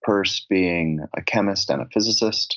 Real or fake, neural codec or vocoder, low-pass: real; none; 7.2 kHz